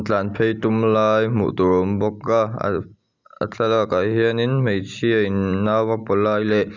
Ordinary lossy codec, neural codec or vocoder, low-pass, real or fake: none; none; 7.2 kHz; real